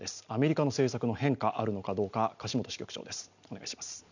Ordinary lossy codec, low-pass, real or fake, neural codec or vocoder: none; 7.2 kHz; real; none